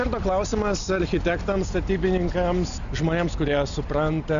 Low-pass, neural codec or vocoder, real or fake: 7.2 kHz; none; real